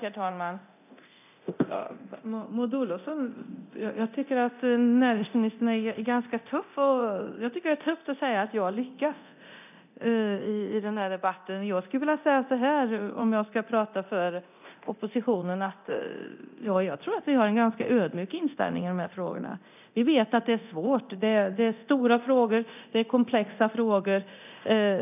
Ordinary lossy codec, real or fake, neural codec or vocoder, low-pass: none; fake; codec, 24 kHz, 0.9 kbps, DualCodec; 3.6 kHz